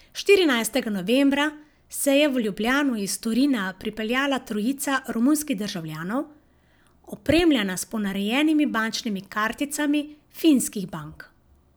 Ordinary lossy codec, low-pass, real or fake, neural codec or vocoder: none; none; real; none